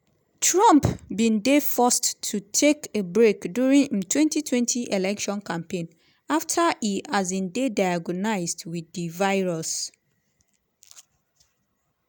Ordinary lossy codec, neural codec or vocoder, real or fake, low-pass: none; none; real; none